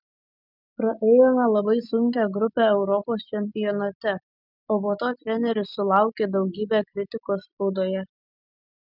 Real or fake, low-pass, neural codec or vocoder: real; 5.4 kHz; none